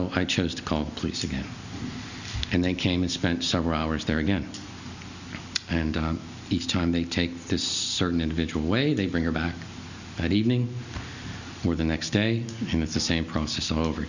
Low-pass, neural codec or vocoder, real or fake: 7.2 kHz; none; real